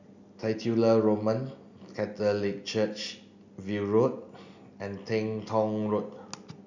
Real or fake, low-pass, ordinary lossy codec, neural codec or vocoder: real; 7.2 kHz; none; none